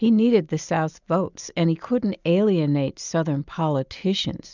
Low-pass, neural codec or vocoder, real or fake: 7.2 kHz; none; real